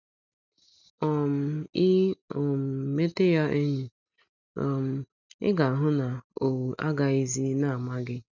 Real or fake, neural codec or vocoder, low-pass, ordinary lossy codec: real; none; 7.2 kHz; none